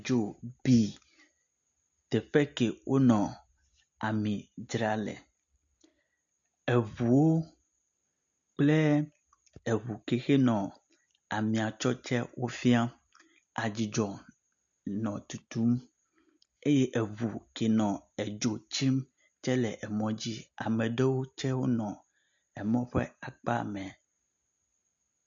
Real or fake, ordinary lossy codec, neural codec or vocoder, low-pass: real; MP3, 96 kbps; none; 7.2 kHz